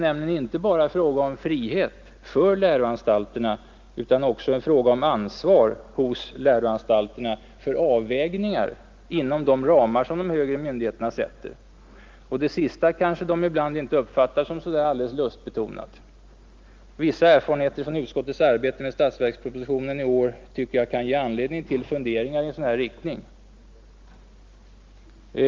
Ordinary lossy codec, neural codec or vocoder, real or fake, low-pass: Opus, 32 kbps; none; real; 7.2 kHz